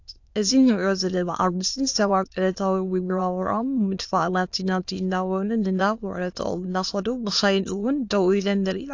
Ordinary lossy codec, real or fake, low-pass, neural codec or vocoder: AAC, 48 kbps; fake; 7.2 kHz; autoencoder, 22.05 kHz, a latent of 192 numbers a frame, VITS, trained on many speakers